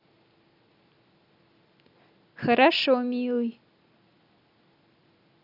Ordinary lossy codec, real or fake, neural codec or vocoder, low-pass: none; real; none; 5.4 kHz